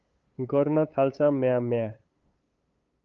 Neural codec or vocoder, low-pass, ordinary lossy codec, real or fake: codec, 16 kHz, 8 kbps, FunCodec, trained on LibriTTS, 25 frames a second; 7.2 kHz; Opus, 24 kbps; fake